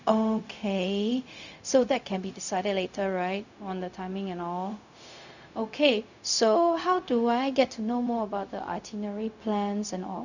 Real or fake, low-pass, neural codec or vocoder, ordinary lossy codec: fake; 7.2 kHz; codec, 16 kHz, 0.4 kbps, LongCat-Audio-Codec; none